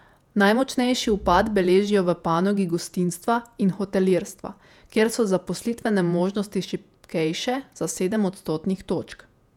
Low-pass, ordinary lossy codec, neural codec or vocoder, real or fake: 19.8 kHz; none; vocoder, 48 kHz, 128 mel bands, Vocos; fake